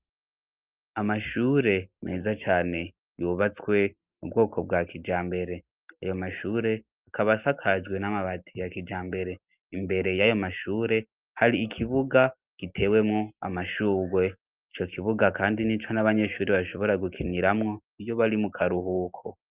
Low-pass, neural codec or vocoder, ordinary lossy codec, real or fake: 3.6 kHz; none; Opus, 24 kbps; real